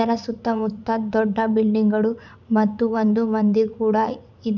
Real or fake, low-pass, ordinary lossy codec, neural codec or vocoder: fake; 7.2 kHz; none; vocoder, 44.1 kHz, 80 mel bands, Vocos